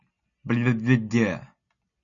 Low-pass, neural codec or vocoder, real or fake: 7.2 kHz; none; real